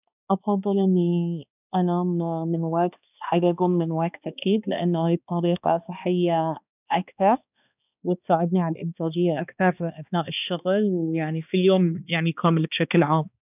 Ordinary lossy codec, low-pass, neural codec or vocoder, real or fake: none; 3.6 kHz; codec, 16 kHz, 2 kbps, X-Codec, HuBERT features, trained on balanced general audio; fake